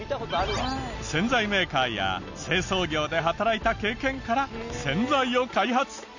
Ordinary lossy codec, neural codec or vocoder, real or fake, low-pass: none; none; real; 7.2 kHz